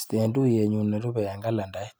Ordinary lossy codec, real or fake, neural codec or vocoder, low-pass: none; real; none; none